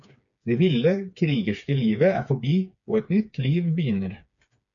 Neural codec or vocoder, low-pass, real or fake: codec, 16 kHz, 4 kbps, FreqCodec, smaller model; 7.2 kHz; fake